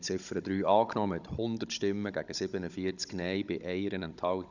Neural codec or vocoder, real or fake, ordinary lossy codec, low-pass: codec, 16 kHz, 16 kbps, FunCodec, trained on Chinese and English, 50 frames a second; fake; none; 7.2 kHz